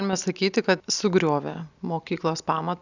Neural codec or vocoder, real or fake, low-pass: none; real; 7.2 kHz